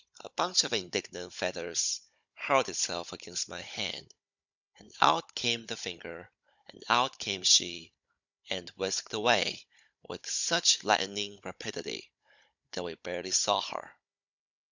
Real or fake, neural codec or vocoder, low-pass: fake; codec, 16 kHz, 16 kbps, FunCodec, trained on Chinese and English, 50 frames a second; 7.2 kHz